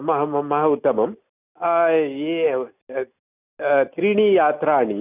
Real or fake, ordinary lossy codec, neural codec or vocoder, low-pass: real; none; none; 3.6 kHz